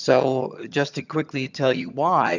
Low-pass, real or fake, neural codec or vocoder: 7.2 kHz; fake; vocoder, 22.05 kHz, 80 mel bands, HiFi-GAN